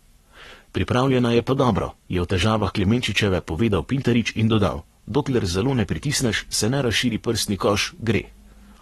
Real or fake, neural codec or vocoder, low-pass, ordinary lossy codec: fake; codec, 44.1 kHz, 7.8 kbps, Pupu-Codec; 19.8 kHz; AAC, 32 kbps